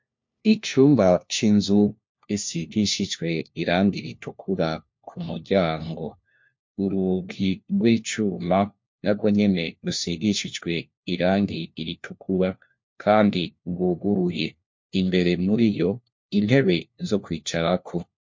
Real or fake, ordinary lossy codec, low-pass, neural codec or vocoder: fake; MP3, 48 kbps; 7.2 kHz; codec, 16 kHz, 1 kbps, FunCodec, trained on LibriTTS, 50 frames a second